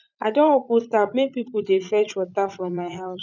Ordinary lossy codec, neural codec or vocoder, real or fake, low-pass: none; vocoder, 44.1 kHz, 80 mel bands, Vocos; fake; 7.2 kHz